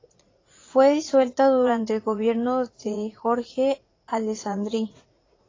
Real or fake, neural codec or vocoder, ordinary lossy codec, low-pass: fake; vocoder, 44.1 kHz, 80 mel bands, Vocos; AAC, 32 kbps; 7.2 kHz